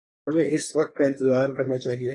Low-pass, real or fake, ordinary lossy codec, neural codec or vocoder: 10.8 kHz; fake; AAC, 32 kbps; codec, 24 kHz, 1 kbps, SNAC